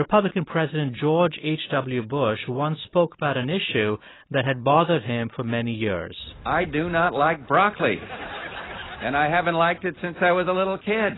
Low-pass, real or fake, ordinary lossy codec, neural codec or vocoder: 7.2 kHz; real; AAC, 16 kbps; none